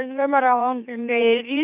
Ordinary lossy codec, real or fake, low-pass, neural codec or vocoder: none; fake; 3.6 kHz; autoencoder, 44.1 kHz, a latent of 192 numbers a frame, MeloTTS